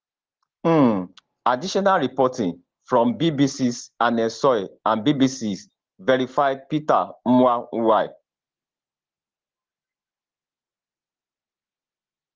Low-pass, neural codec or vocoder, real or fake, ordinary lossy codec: 7.2 kHz; none; real; Opus, 16 kbps